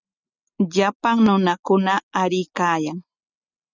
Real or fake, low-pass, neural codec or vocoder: real; 7.2 kHz; none